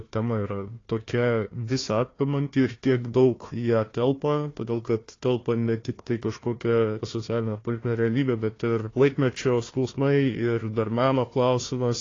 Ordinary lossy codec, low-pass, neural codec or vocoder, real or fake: AAC, 32 kbps; 7.2 kHz; codec, 16 kHz, 1 kbps, FunCodec, trained on Chinese and English, 50 frames a second; fake